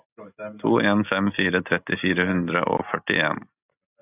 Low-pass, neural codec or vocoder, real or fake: 3.6 kHz; none; real